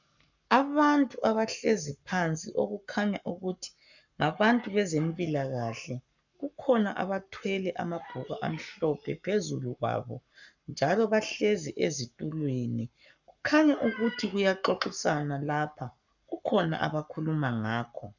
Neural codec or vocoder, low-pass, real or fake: codec, 44.1 kHz, 7.8 kbps, Pupu-Codec; 7.2 kHz; fake